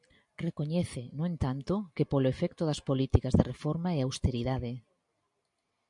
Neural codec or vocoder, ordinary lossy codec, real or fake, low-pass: none; MP3, 96 kbps; real; 10.8 kHz